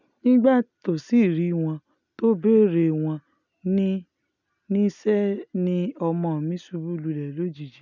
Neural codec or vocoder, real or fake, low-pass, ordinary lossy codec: none; real; 7.2 kHz; none